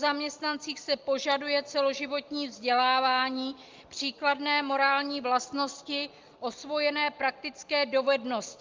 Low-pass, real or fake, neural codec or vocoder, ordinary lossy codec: 7.2 kHz; real; none; Opus, 24 kbps